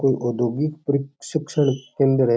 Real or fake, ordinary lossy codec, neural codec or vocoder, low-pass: real; none; none; none